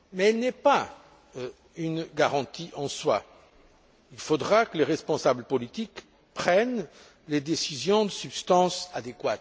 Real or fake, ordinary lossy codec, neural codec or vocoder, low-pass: real; none; none; none